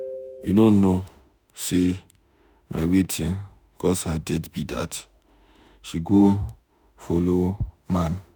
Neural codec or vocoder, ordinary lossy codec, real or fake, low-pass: autoencoder, 48 kHz, 32 numbers a frame, DAC-VAE, trained on Japanese speech; none; fake; none